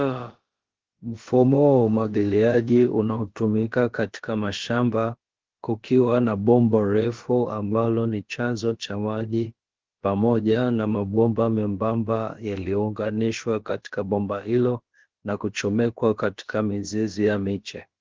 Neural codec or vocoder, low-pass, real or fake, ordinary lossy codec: codec, 16 kHz, about 1 kbps, DyCAST, with the encoder's durations; 7.2 kHz; fake; Opus, 16 kbps